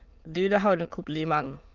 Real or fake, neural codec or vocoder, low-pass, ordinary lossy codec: fake; autoencoder, 22.05 kHz, a latent of 192 numbers a frame, VITS, trained on many speakers; 7.2 kHz; Opus, 32 kbps